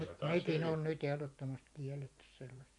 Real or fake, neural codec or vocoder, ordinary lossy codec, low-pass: fake; vocoder, 24 kHz, 100 mel bands, Vocos; none; 10.8 kHz